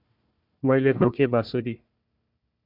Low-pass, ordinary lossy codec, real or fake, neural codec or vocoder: 5.4 kHz; MP3, 48 kbps; fake; codec, 16 kHz, 1 kbps, FunCodec, trained on Chinese and English, 50 frames a second